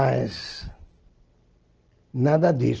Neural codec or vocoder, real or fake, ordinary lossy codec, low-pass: none; real; Opus, 16 kbps; 7.2 kHz